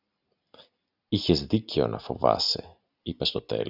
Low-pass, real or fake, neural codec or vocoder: 5.4 kHz; real; none